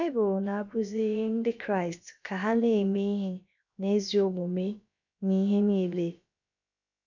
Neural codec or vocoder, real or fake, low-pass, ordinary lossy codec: codec, 16 kHz, about 1 kbps, DyCAST, with the encoder's durations; fake; 7.2 kHz; none